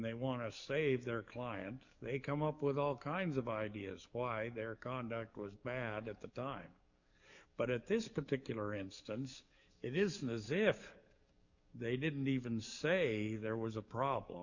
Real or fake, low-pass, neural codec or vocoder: fake; 7.2 kHz; codec, 44.1 kHz, 7.8 kbps, DAC